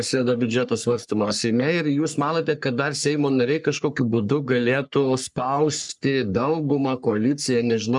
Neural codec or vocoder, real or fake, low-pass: codec, 44.1 kHz, 3.4 kbps, Pupu-Codec; fake; 10.8 kHz